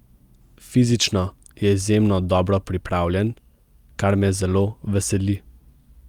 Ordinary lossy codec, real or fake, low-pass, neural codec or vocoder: Opus, 32 kbps; real; 19.8 kHz; none